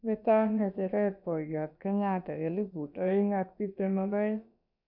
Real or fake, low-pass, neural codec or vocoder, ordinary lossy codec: fake; 5.4 kHz; codec, 16 kHz, about 1 kbps, DyCAST, with the encoder's durations; none